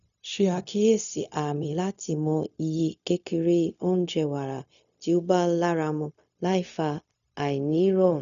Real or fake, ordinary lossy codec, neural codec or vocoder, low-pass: fake; none; codec, 16 kHz, 0.4 kbps, LongCat-Audio-Codec; 7.2 kHz